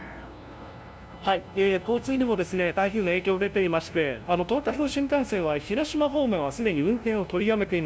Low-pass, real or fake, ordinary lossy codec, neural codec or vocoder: none; fake; none; codec, 16 kHz, 0.5 kbps, FunCodec, trained on LibriTTS, 25 frames a second